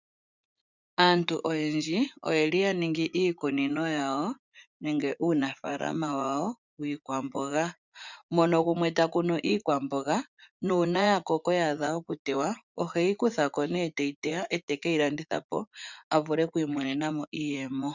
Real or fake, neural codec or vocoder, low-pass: fake; vocoder, 44.1 kHz, 80 mel bands, Vocos; 7.2 kHz